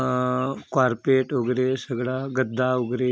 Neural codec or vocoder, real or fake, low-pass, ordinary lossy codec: none; real; none; none